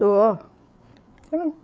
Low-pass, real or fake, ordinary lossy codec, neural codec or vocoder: none; fake; none; codec, 16 kHz, 8 kbps, FreqCodec, larger model